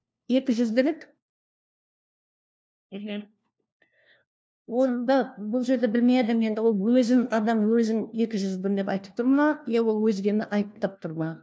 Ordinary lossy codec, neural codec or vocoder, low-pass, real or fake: none; codec, 16 kHz, 1 kbps, FunCodec, trained on LibriTTS, 50 frames a second; none; fake